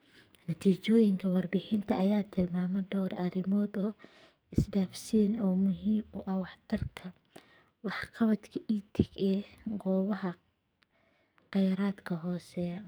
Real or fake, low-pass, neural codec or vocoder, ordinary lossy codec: fake; none; codec, 44.1 kHz, 2.6 kbps, SNAC; none